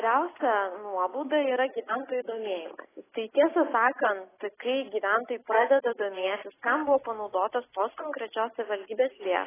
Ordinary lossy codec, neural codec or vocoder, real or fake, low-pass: AAC, 16 kbps; none; real; 3.6 kHz